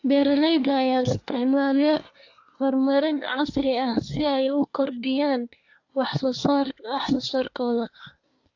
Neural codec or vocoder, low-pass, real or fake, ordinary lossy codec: codec, 24 kHz, 1 kbps, SNAC; 7.2 kHz; fake; AAC, 48 kbps